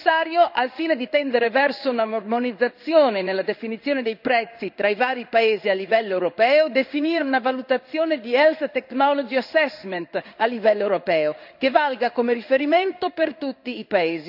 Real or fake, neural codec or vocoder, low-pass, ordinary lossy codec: fake; codec, 16 kHz in and 24 kHz out, 1 kbps, XY-Tokenizer; 5.4 kHz; none